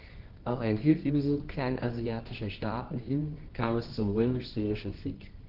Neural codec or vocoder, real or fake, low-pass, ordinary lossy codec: codec, 24 kHz, 0.9 kbps, WavTokenizer, medium music audio release; fake; 5.4 kHz; Opus, 16 kbps